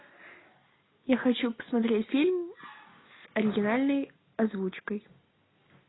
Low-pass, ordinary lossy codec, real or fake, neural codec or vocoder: 7.2 kHz; AAC, 16 kbps; real; none